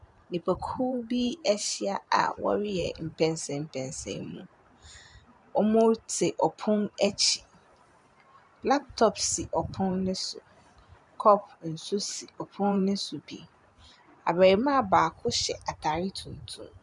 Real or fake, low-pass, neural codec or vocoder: fake; 10.8 kHz; vocoder, 44.1 kHz, 128 mel bands every 512 samples, BigVGAN v2